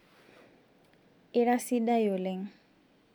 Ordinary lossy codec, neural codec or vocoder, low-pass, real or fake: none; none; 19.8 kHz; real